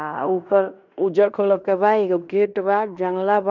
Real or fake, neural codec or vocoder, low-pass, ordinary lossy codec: fake; codec, 16 kHz in and 24 kHz out, 0.9 kbps, LongCat-Audio-Codec, fine tuned four codebook decoder; 7.2 kHz; none